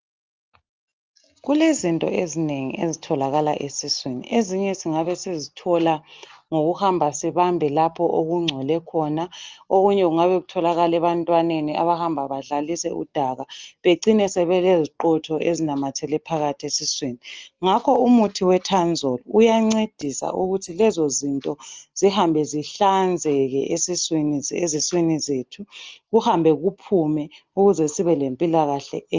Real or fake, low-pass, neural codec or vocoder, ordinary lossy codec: real; 7.2 kHz; none; Opus, 24 kbps